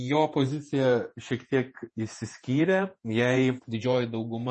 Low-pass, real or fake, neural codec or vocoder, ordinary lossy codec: 10.8 kHz; fake; vocoder, 48 kHz, 128 mel bands, Vocos; MP3, 32 kbps